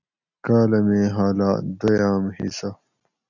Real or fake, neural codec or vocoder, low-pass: real; none; 7.2 kHz